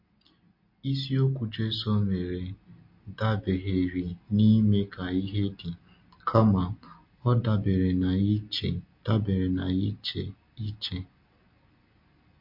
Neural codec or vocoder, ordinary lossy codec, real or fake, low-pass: none; MP3, 32 kbps; real; 5.4 kHz